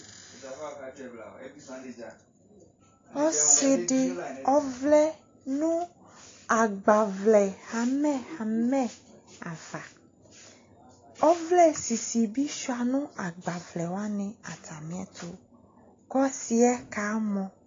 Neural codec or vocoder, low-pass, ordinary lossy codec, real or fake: none; 7.2 kHz; AAC, 32 kbps; real